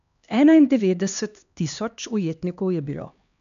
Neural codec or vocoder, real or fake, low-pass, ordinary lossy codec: codec, 16 kHz, 2 kbps, X-Codec, HuBERT features, trained on LibriSpeech; fake; 7.2 kHz; none